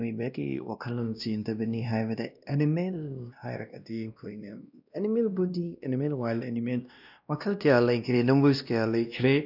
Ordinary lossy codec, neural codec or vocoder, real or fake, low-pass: none; codec, 16 kHz, 1 kbps, X-Codec, WavLM features, trained on Multilingual LibriSpeech; fake; 5.4 kHz